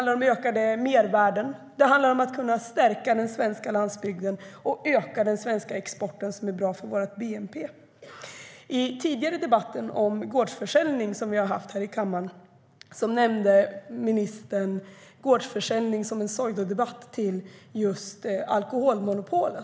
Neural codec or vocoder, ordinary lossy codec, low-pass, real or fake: none; none; none; real